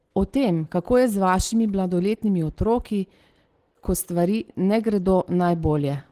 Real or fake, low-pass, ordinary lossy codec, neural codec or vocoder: real; 14.4 kHz; Opus, 16 kbps; none